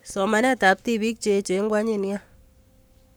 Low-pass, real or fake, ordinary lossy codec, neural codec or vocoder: none; fake; none; codec, 44.1 kHz, 7.8 kbps, Pupu-Codec